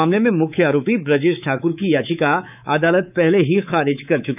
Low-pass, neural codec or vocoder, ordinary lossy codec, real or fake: 3.6 kHz; codec, 24 kHz, 3.1 kbps, DualCodec; none; fake